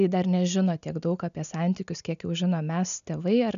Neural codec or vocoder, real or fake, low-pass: none; real; 7.2 kHz